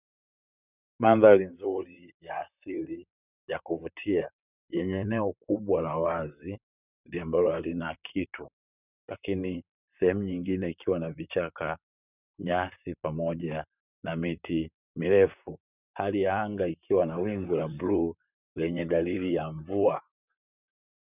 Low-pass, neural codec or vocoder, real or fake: 3.6 kHz; vocoder, 44.1 kHz, 128 mel bands, Pupu-Vocoder; fake